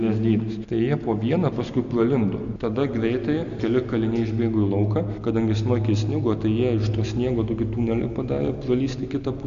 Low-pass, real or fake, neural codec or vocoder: 7.2 kHz; real; none